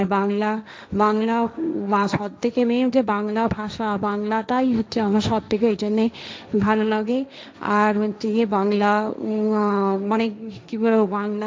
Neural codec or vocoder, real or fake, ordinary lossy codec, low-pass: codec, 16 kHz, 1.1 kbps, Voila-Tokenizer; fake; none; none